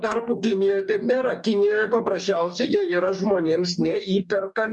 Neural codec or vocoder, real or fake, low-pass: codec, 44.1 kHz, 2.6 kbps, DAC; fake; 10.8 kHz